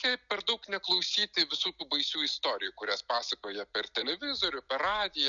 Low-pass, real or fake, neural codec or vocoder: 7.2 kHz; real; none